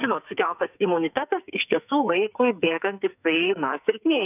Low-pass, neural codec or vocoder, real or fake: 3.6 kHz; codec, 44.1 kHz, 2.6 kbps, SNAC; fake